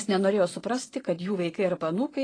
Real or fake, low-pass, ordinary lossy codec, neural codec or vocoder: fake; 9.9 kHz; AAC, 32 kbps; vocoder, 24 kHz, 100 mel bands, Vocos